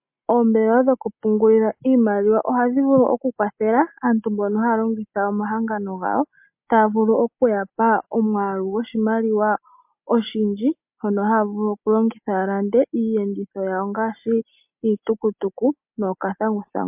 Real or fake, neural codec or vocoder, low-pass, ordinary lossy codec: real; none; 3.6 kHz; MP3, 32 kbps